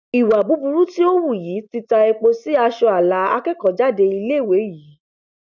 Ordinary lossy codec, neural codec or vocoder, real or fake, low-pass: none; none; real; 7.2 kHz